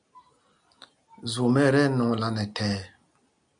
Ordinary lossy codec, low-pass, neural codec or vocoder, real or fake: MP3, 96 kbps; 9.9 kHz; none; real